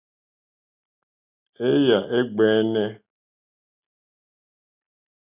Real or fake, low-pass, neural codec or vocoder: real; 3.6 kHz; none